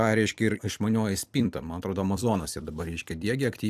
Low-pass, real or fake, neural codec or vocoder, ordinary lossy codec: 14.4 kHz; fake; vocoder, 44.1 kHz, 128 mel bands every 256 samples, BigVGAN v2; AAC, 96 kbps